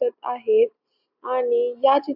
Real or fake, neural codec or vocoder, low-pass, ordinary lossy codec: real; none; 5.4 kHz; AAC, 48 kbps